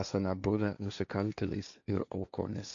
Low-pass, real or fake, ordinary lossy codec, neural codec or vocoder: 7.2 kHz; fake; AAC, 64 kbps; codec, 16 kHz, 1.1 kbps, Voila-Tokenizer